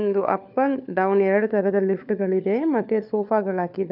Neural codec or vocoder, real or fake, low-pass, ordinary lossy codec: codec, 16 kHz, 4 kbps, FunCodec, trained on LibriTTS, 50 frames a second; fake; 5.4 kHz; none